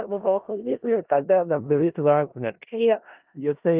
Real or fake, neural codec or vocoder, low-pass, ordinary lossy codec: fake; codec, 16 kHz in and 24 kHz out, 0.4 kbps, LongCat-Audio-Codec, four codebook decoder; 3.6 kHz; Opus, 32 kbps